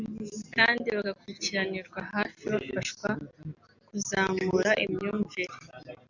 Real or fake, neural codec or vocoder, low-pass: real; none; 7.2 kHz